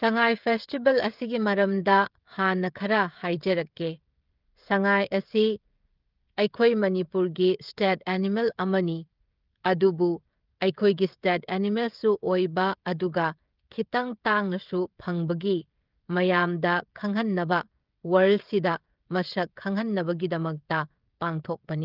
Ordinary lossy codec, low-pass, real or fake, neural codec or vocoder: Opus, 32 kbps; 5.4 kHz; fake; codec, 16 kHz, 16 kbps, FreqCodec, smaller model